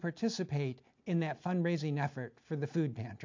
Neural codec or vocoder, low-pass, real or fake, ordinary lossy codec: none; 7.2 kHz; real; MP3, 48 kbps